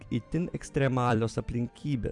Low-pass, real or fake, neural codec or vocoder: 10.8 kHz; fake; vocoder, 44.1 kHz, 128 mel bands every 256 samples, BigVGAN v2